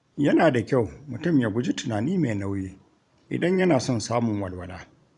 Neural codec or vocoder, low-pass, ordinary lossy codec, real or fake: vocoder, 44.1 kHz, 128 mel bands every 512 samples, BigVGAN v2; 10.8 kHz; none; fake